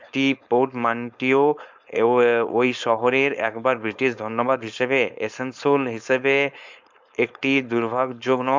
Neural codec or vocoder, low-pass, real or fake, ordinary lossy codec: codec, 16 kHz, 4.8 kbps, FACodec; 7.2 kHz; fake; MP3, 64 kbps